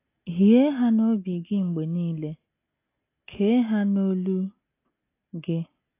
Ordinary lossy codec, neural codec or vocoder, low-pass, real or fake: AAC, 24 kbps; none; 3.6 kHz; real